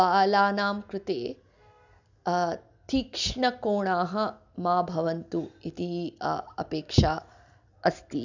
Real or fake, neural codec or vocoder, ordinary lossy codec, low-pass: real; none; none; 7.2 kHz